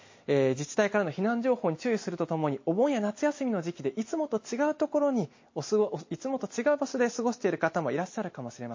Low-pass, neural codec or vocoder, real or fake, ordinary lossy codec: 7.2 kHz; none; real; MP3, 32 kbps